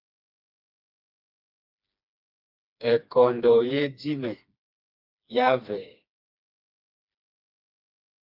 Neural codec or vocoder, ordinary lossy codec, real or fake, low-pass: codec, 16 kHz, 2 kbps, FreqCodec, smaller model; MP3, 48 kbps; fake; 5.4 kHz